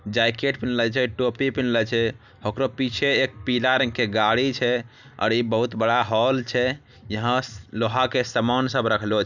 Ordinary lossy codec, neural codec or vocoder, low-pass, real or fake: none; none; 7.2 kHz; real